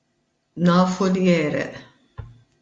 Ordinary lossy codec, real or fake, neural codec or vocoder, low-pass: AAC, 48 kbps; real; none; 9.9 kHz